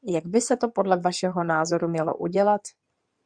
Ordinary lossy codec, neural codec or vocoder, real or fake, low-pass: Opus, 64 kbps; codec, 16 kHz in and 24 kHz out, 2.2 kbps, FireRedTTS-2 codec; fake; 9.9 kHz